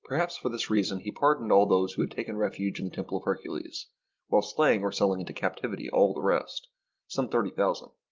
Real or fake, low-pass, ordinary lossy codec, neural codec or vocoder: real; 7.2 kHz; Opus, 32 kbps; none